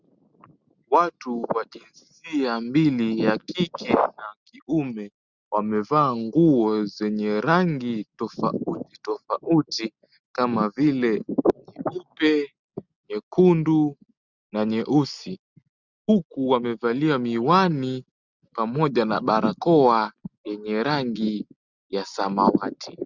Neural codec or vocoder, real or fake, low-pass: none; real; 7.2 kHz